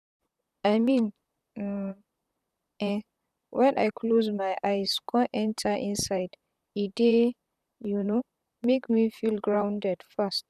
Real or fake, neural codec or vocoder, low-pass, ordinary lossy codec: fake; vocoder, 44.1 kHz, 128 mel bands, Pupu-Vocoder; 14.4 kHz; none